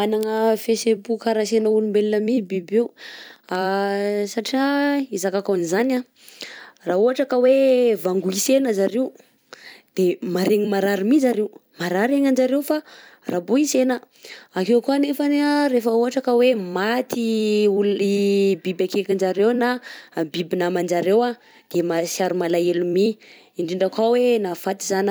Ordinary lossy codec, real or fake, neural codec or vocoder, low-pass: none; fake; vocoder, 44.1 kHz, 128 mel bands every 256 samples, BigVGAN v2; none